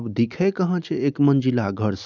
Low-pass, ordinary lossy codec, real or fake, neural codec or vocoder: 7.2 kHz; none; real; none